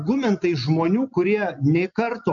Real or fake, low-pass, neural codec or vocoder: real; 7.2 kHz; none